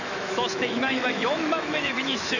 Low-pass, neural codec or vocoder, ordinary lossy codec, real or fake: 7.2 kHz; autoencoder, 48 kHz, 128 numbers a frame, DAC-VAE, trained on Japanese speech; none; fake